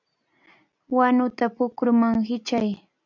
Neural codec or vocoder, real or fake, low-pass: none; real; 7.2 kHz